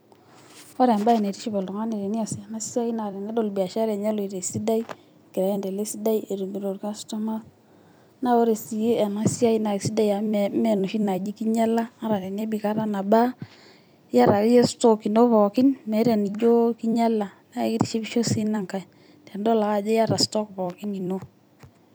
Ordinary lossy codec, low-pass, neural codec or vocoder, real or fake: none; none; vocoder, 44.1 kHz, 128 mel bands every 256 samples, BigVGAN v2; fake